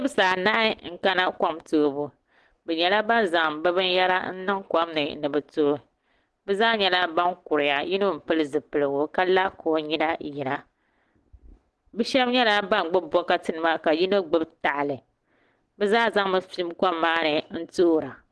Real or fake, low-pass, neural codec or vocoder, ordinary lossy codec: real; 10.8 kHz; none; Opus, 16 kbps